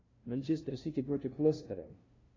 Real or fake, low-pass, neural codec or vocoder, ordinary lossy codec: fake; 7.2 kHz; codec, 16 kHz, 0.5 kbps, FunCodec, trained on LibriTTS, 25 frames a second; AAC, 32 kbps